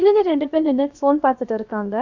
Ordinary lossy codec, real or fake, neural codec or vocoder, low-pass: none; fake; codec, 16 kHz, about 1 kbps, DyCAST, with the encoder's durations; 7.2 kHz